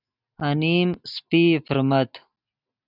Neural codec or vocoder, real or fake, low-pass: none; real; 5.4 kHz